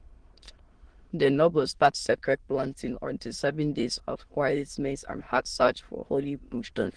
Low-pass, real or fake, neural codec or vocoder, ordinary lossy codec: 9.9 kHz; fake; autoencoder, 22.05 kHz, a latent of 192 numbers a frame, VITS, trained on many speakers; Opus, 16 kbps